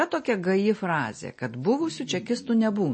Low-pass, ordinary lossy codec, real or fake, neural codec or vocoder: 9.9 kHz; MP3, 32 kbps; real; none